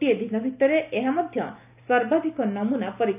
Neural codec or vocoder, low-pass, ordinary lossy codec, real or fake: none; 3.6 kHz; none; real